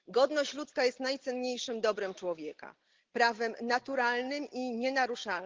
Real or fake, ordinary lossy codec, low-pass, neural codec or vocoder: real; Opus, 16 kbps; 7.2 kHz; none